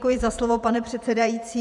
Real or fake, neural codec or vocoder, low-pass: fake; vocoder, 48 kHz, 128 mel bands, Vocos; 10.8 kHz